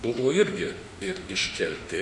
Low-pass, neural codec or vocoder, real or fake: 10.8 kHz; autoencoder, 48 kHz, 32 numbers a frame, DAC-VAE, trained on Japanese speech; fake